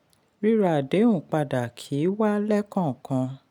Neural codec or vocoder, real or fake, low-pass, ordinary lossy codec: none; real; 19.8 kHz; none